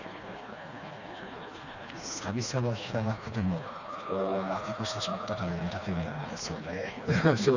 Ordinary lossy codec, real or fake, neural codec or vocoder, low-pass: none; fake; codec, 16 kHz, 2 kbps, FreqCodec, smaller model; 7.2 kHz